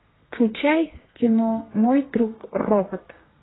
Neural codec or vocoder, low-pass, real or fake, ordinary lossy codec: codec, 32 kHz, 1.9 kbps, SNAC; 7.2 kHz; fake; AAC, 16 kbps